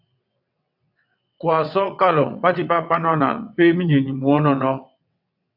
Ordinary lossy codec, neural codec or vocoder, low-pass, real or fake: AAC, 48 kbps; vocoder, 22.05 kHz, 80 mel bands, WaveNeXt; 5.4 kHz; fake